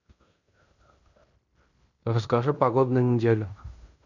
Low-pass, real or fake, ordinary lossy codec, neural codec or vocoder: 7.2 kHz; fake; none; codec, 16 kHz in and 24 kHz out, 0.9 kbps, LongCat-Audio-Codec, fine tuned four codebook decoder